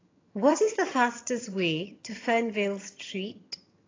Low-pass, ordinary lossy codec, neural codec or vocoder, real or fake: 7.2 kHz; AAC, 32 kbps; vocoder, 22.05 kHz, 80 mel bands, HiFi-GAN; fake